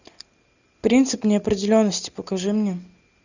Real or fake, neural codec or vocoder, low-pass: real; none; 7.2 kHz